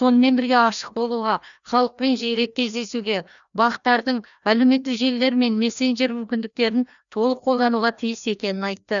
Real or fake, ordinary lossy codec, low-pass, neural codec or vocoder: fake; none; 7.2 kHz; codec, 16 kHz, 1 kbps, FreqCodec, larger model